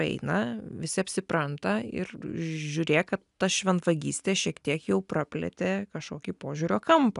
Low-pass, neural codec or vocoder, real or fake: 10.8 kHz; none; real